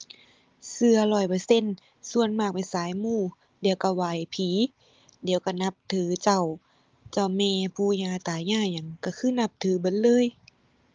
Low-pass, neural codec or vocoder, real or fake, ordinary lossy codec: 7.2 kHz; none; real; Opus, 32 kbps